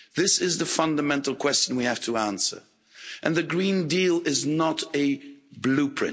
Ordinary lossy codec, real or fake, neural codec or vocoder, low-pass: none; real; none; none